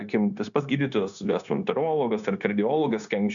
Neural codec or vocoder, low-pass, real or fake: codec, 16 kHz, 0.9 kbps, LongCat-Audio-Codec; 7.2 kHz; fake